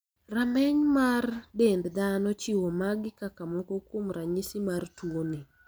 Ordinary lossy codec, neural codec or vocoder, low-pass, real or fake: none; none; none; real